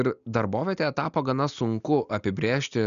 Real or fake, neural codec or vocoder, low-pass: real; none; 7.2 kHz